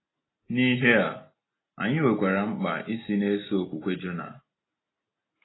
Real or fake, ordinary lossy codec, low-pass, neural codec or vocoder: real; AAC, 16 kbps; 7.2 kHz; none